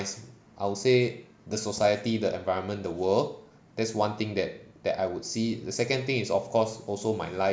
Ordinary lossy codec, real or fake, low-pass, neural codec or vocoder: none; real; none; none